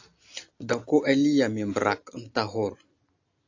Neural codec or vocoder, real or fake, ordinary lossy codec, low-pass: none; real; AAC, 48 kbps; 7.2 kHz